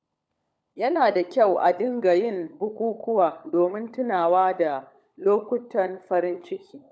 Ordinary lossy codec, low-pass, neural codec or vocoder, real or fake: none; none; codec, 16 kHz, 16 kbps, FunCodec, trained on LibriTTS, 50 frames a second; fake